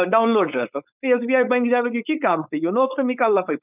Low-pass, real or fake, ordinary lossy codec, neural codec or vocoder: 3.6 kHz; fake; none; codec, 16 kHz, 4.8 kbps, FACodec